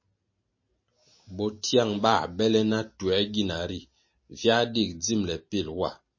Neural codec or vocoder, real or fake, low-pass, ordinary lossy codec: none; real; 7.2 kHz; MP3, 32 kbps